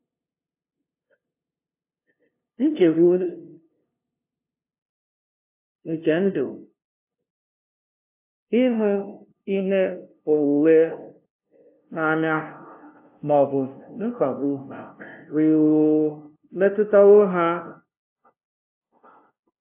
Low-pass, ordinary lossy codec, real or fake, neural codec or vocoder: 3.6 kHz; AAC, 32 kbps; fake; codec, 16 kHz, 0.5 kbps, FunCodec, trained on LibriTTS, 25 frames a second